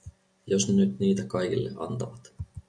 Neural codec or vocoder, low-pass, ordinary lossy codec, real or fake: none; 9.9 kHz; MP3, 96 kbps; real